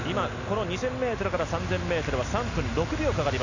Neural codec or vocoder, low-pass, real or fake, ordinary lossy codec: none; 7.2 kHz; real; none